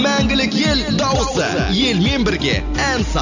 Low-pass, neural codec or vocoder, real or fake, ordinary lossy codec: 7.2 kHz; none; real; none